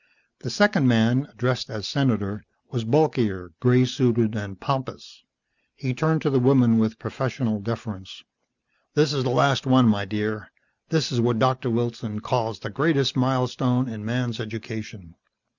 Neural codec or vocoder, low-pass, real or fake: none; 7.2 kHz; real